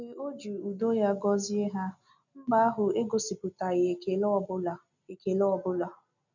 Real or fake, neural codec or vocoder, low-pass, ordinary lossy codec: real; none; 7.2 kHz; none